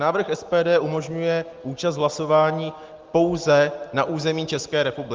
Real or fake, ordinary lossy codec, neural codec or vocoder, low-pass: real; Opus, 32 kbps; none; 7.2 kHz